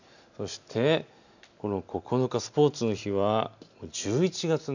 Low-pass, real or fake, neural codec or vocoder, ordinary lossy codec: 7.2 kHz; real; none; none